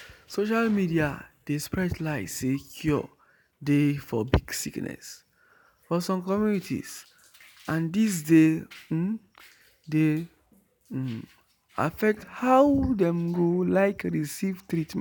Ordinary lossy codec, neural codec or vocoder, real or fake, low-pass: none; none; real; none